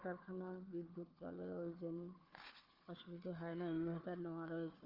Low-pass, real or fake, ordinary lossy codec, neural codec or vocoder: 5.4 kHz; fake; Opus, 32 kbps; codec, 16 kHz, 4 kbps, FunCodec, trained on Chinese and English, 50 frames a second